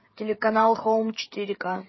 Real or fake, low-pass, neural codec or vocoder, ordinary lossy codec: fake; 7.2 kHz; codec, 16 kHz, 16 kbps, FreqCodec, smaller model; MP3, 24 kbps